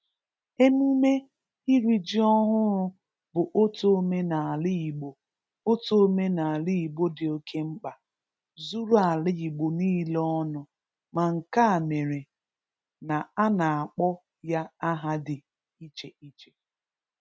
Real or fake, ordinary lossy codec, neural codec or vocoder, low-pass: real; none; none; none